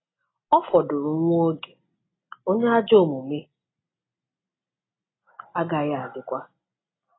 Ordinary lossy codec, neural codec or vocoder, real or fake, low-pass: AAC, 16 kbps; none; real; 7.2 kHz